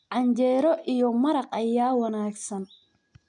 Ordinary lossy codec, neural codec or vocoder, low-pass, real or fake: none; none; 9.9 kHz; real